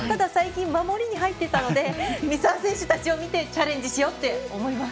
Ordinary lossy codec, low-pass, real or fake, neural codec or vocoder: none; none; real; none